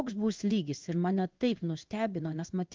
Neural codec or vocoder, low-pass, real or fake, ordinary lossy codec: codec, 16 kHz in and 24 kHz out, 1 kbps, XY-Tokenizer; 7.2 kHz; fake; Opus, 24 kbps